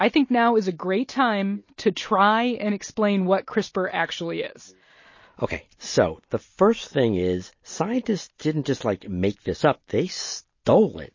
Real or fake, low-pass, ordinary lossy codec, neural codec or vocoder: real; 7.2 kHz; MP3, 32 kbps; none